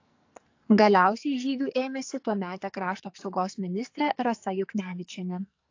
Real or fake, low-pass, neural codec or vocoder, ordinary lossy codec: fake; 7.2 kHz; codec, 44.1 kHz, 2.6 kbps, SNAC; AAC, 48 kbps